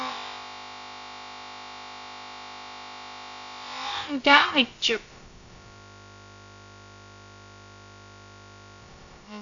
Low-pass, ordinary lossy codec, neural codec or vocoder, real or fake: 7.2 kHz; AAC, 64 kbps; codec, 16 kHz, about 1 kbps, DyCAST, with the encoder's durations; fake